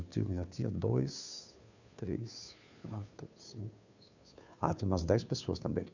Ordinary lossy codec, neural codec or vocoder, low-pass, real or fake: none; codec, 16 kHz, 2 kbps, FunCodec, trained on Chinese and English, 25 frames a second; 7.2 kHz; fake